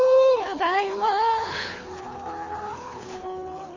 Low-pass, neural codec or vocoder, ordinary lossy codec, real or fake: 7.2 kHz; codec, 24 kHz, 6 kbps, HILCodec; MP3, 32 kbps; fake